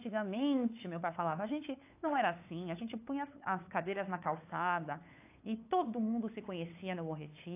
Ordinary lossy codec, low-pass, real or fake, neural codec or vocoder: AAC, 24 kbps; 3.6 kHz; fake; codec, 16 kHz, 8 kbps, FunCodec, trained on LibriTTS, 25 frames a second